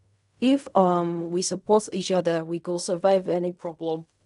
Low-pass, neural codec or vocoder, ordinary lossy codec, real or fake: 10.8 kHz; codec, 16 kHz in and 24 kHz out, 0.4 kbps, LongCat-Audio-Codec, fine tuned four codebook decoder; none; fake